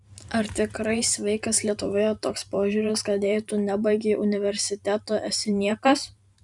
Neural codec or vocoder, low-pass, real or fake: vocoder, 44.1 kHz, 128 mel bands every 512 samples, BigVGAN v2; 10.8 kHz; fake